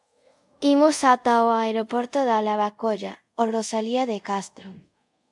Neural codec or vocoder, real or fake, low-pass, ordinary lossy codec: codec, 24 kHz, 0.5 kbps, DualCodec; fake; 10.8 kHz; MP3, 64 kbps